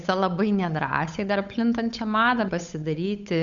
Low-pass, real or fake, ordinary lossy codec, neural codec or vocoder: 7.2 kHz; fake; Opus, 64 kbps; codec, 16 kHz, 8 kbps, FunCodec, trained on Chinese and English, 25 frames a second